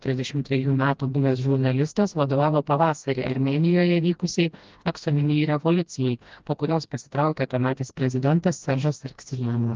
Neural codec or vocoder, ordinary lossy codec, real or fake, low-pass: codec, 16 kHz, 1 kbps, FreqCodec, smaller model; Opus, 24 kbps; fake; 7.2 kHz